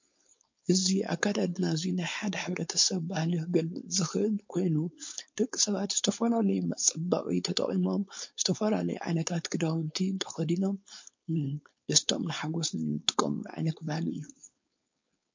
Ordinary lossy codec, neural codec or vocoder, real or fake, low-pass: MP3, 48 kbps; codec, 16 kHz, 4.8 kbps, FACodec; fake; 7.2 kHz